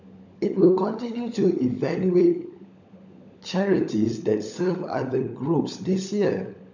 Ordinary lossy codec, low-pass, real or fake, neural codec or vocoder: none; 7.2 kHz; fake; codec, 16 kHz, 16 kbps, FunCodec, trained on LibriTTS, 50 frames a second